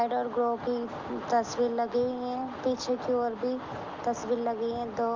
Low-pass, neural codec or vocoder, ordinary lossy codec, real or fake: 7.2 kHz; none; Opus, 32 kbps; real